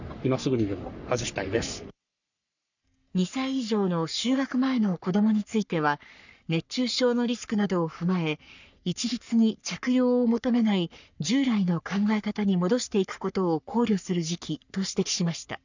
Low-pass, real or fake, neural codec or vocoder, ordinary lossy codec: 7.2 kHz; fake; codec, 44.1 kHz, 3.4 kbps, Pupu-Codec; none